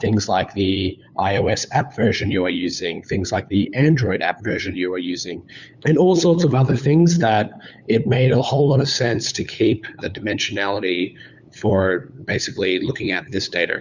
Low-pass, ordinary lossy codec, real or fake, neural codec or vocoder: 7.2 kHz; Opus, 64 kbps; fake; codec, 16 kHz, 8 kbps, FunCodec, trained on LibriTTS, 25 frames a second